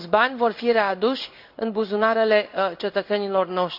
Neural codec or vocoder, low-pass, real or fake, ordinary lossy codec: codec, 16 kHz in and 24 kHz out, 1 kbps, XY-Tokenizer; 5.4 kHz; fake; none